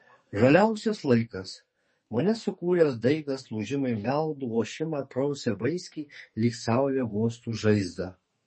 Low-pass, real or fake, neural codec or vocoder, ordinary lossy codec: 10.8 kHz; fake; codec, 44.1 kHz, 2.6 kbps, SNAC; MP3, 32 kbps